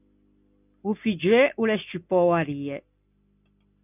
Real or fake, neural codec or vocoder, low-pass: real; none; 3.6 kHz